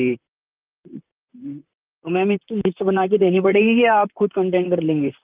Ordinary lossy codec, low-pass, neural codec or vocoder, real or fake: Opus, 24 kbps; 3.6 kHz; vocoder, 44.1 kHz, 128 mel bands, Pupu-Vocoder; fake